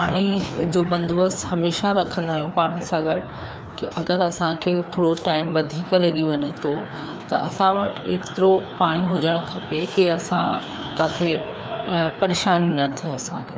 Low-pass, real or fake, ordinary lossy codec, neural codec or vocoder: none; fake; none; codec, 16 kHz, 2 kbps, FreqCodec, larger model